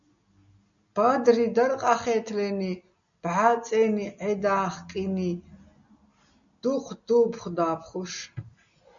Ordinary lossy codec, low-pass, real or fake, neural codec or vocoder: MP3, 96 kbps; 7.2 kHz; real; none